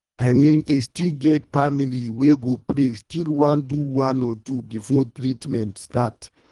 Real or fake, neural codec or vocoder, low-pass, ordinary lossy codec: fake; codec, 24 kHz, 1.5 kbps, HILCodec; 10.8 kHz; Opus, 32 kbps